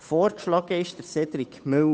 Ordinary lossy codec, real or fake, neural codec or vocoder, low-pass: none; fake; codec, 16 kHz, 8 kbps, FunCodec, trained on Chinese and English, 25 frames a second; none